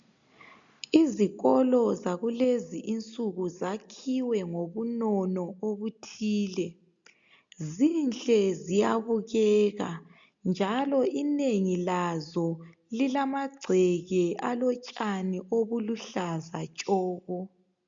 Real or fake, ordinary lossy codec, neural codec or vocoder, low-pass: real; MP3, 64 kbps; none; 7.2 kHz